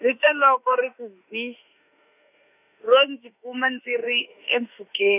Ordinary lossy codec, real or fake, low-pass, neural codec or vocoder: none; fake; 3.6 kHz; autoencoder, 48 kHz, 32 numbers a frame, DAC-VAE, trained on Japanese speech